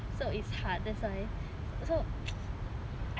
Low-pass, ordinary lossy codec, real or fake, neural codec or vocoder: none; none; real; none